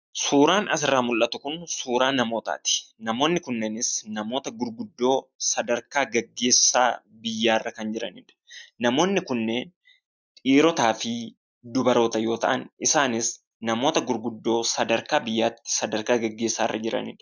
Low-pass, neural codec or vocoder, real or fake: 7.2 kHz; none; real